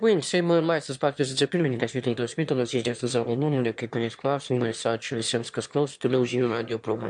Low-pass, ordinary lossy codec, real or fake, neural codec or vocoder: 9.9 kHz; MP3, 64 kbps; fake; autoencoder, 22.05 kHz, a latent of 192 numbers a frame, VITS, trained on one speaker